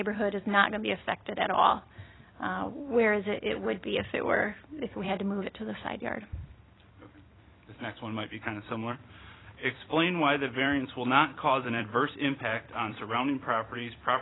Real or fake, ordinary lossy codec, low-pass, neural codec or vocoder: fake; AAC, 16 kbps; 7.2 kHz; vocoder, 44.1 kHz, 128 mel bands every 256 samples, BigVGAN v2